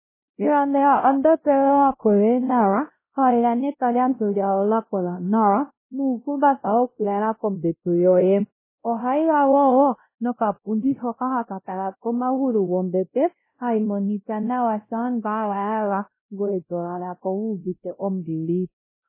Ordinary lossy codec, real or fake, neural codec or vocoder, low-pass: MP3, 16 kbps; fake; codec, 16 kHz, 0.5 kbps, X-Codec, WavLM features, trained on Multilingual LibriSpeech; 3.6 kHz